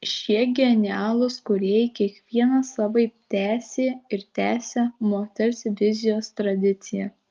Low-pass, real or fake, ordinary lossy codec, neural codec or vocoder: 7.2 kHz; real; Opus, 24 kbps; none